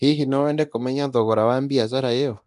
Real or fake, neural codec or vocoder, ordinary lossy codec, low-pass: fake; codec, 24 kHz, 0.9 kbps, DualCodec; none; 10.8 kHz